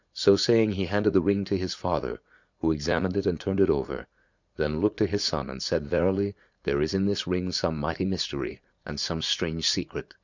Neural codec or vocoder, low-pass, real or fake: vocoder, 44.1 kHz, 80 mel bands, Vocos; 7.2 kHz; fake